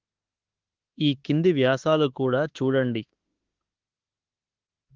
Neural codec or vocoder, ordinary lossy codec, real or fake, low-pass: autoencoder, 48 kHz, 32 numbers a frame, DAC-VAE, trained on Japanese speech; Opus, 16 kbps; fake; 7.2 kHz